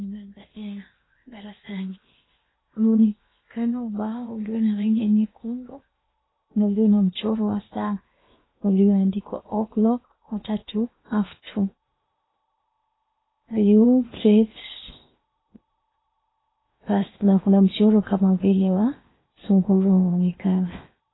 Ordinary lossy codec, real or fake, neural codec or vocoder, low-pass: AAC, 16 kbps; fake; codec, 16 kHz in and 24 kHz out, 0.8 kbps, FocalCodec, streaming, 65536 codes; 7.2 kHz